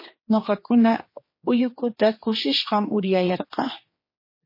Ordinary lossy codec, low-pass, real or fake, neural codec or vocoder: MP3, 24 kbps; 5.4 kHz; fake; codec, 16 kHz, 2 kbps, X-Codec, HuBERT features, trained on general audio